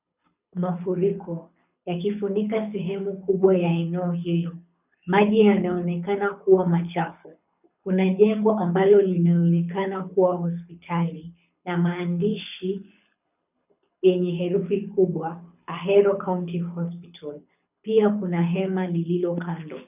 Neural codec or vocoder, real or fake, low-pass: codec, 24 kHz, 6 kbps, HILCodec; fake; 3.6 kHz